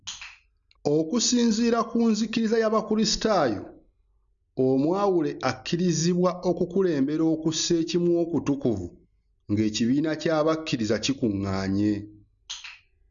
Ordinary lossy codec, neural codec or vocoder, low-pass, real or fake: none; none; 7.2 kHz; real